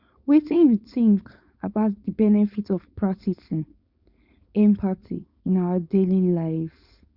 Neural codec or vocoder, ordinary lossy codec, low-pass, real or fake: codec, 16 kHz, 4.8 kbps, FACodec; Opus, 64 kbps; 5.4 kHz; fake